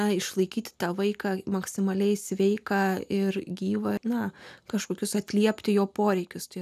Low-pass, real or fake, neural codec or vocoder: 14.4 kHz; real; none